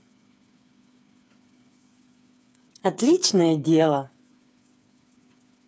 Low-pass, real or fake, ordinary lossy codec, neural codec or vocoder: none; fake; none; codec, 16 kHz, 8 kbps, FreqCodec, smaller model